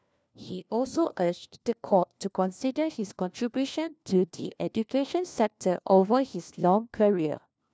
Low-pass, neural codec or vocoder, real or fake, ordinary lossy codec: none; codec, 16 kHz, 1 kbps, FunCodec, trained on LibriTTS, 50 frames a second; fake; none